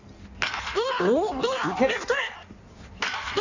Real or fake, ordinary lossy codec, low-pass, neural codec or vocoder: fake; none; 7.2 kHz; codec, 16 kHz in and 24 kHz out, 1.1 kbps, FireRedTTS-2 codec